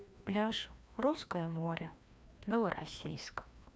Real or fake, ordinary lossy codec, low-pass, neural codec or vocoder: fake; none; none; codec, 16 kHz, 1 kbps, FreqCodec, larger model